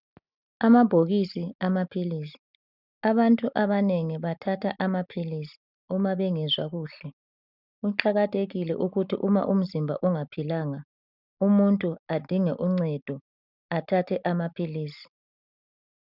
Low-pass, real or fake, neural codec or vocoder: 5.4 kHz; real; none